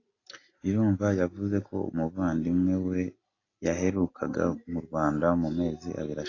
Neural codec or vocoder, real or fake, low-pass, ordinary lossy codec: none; real; 7.2 kHz; AAC, 32 kbps